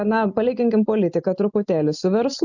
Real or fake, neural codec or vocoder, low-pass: real; none; 7.2 kHz